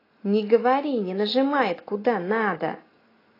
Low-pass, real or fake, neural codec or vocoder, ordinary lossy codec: 5.4 kHz; real; none; AAC, 24 kbps